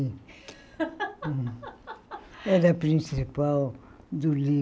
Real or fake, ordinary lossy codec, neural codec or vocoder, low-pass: real; none; none; none